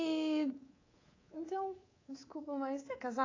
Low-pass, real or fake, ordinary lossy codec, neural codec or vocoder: 7.2 kHz; fake; AAC, 32 kbps; codec, 24 kHz, 3.1 kbps, DualCodec